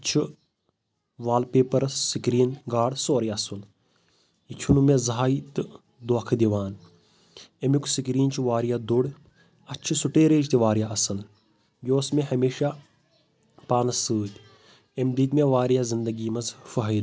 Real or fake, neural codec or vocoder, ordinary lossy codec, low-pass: real; none; none; none